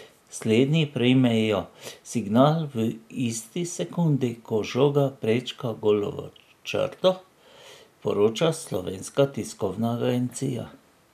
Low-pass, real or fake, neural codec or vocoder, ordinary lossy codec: 14.4 kHz; real; none; none